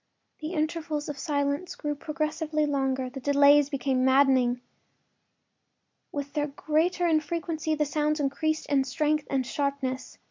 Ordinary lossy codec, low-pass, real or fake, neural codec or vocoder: MP3, 64 kbps; 7.2 kHz; real; none